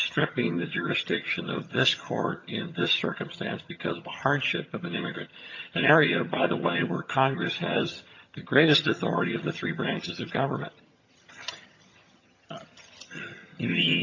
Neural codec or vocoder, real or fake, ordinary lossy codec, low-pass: vocoder, 22.05 kHz, 80 mel bands, HiFi-GAN; fake; AAC, 48 kbps; 7.2 kHz